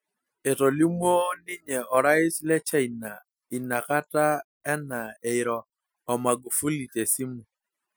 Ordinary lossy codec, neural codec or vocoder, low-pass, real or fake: none; none; none; real